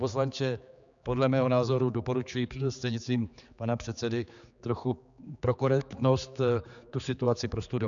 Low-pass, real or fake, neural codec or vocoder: 7.2 kHz; fake; codec, 16 kHz, 4 kbps, X-Codec, HuBERT features, trained on general audio